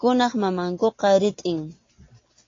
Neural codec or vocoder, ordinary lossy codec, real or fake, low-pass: none; AAC, 32 kbps; real; 7.2 kHz